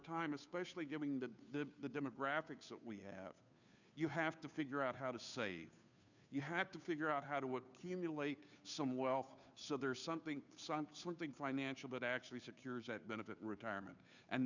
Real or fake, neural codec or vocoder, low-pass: fake; codec, 16 kHz, 2 kbps, FunCodec, trained on Chinese and English, 25 frames a second; 7.2 kHz